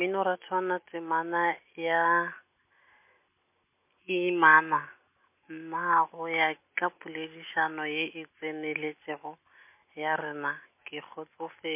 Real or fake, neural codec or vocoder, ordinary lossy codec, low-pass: real; none; MP3, 24 kbps; 3.6 kHz